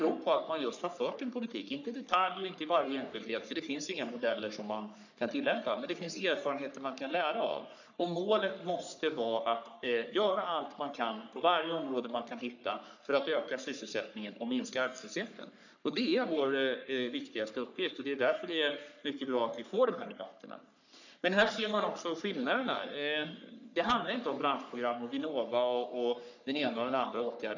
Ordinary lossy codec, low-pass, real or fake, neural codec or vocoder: none; 7.2 kHz; fake; codec, 44.1 kHz, 3.4 kbps, Pupu-Codec